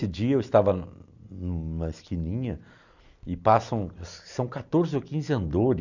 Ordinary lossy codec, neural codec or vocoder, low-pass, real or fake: none; none; 7.2 kHz; real